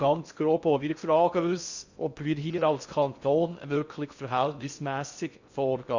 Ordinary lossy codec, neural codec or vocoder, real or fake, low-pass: Opus, 64 kbps; codec, 16 kHz in and 24 kHz out, 0.6 kbps, FocalCodec, streaming, 2048 codes; fake; 7.2 kHz